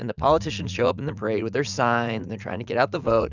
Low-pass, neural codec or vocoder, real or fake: 7.2 kHz; vocoder, 44.1 kHz, 80 mel bands, Vocos; fake